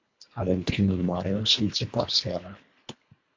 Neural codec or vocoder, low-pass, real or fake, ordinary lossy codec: codec, 24 kHz, 1.5 kbps, HILCodec; 7.2 kHz; fake; MP3, 48 kbps